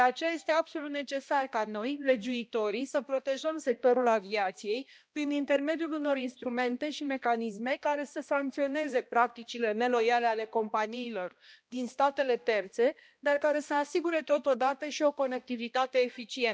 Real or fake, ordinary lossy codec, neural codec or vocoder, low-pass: fake; none; codec, 16 kHz, 1 kbps, X-Codec, HuBERT features, trained on balanced general audio; none